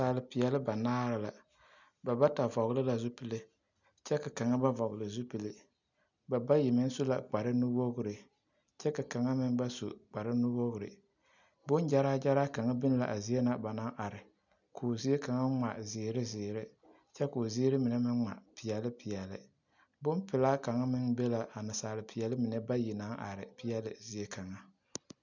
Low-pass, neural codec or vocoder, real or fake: 7.2 kHz; none; real